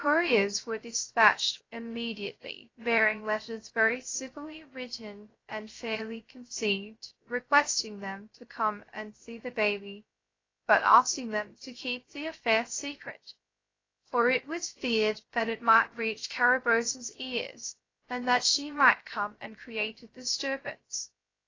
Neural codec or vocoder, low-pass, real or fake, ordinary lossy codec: codec, 16 kHz, 0.3 kbps, FocalCodec; 7.2 kHz; fake; AAC, 32 kbps